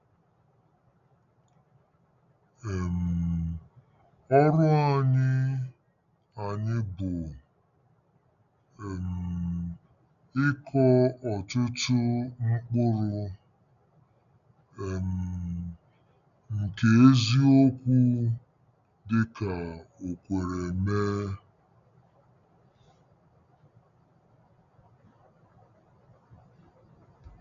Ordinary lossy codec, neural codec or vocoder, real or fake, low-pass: none; none; real; 7.2 kHz